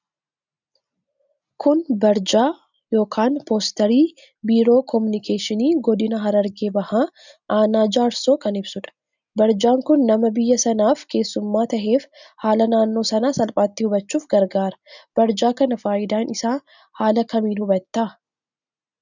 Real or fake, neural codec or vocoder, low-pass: real; none; 7.2 kHz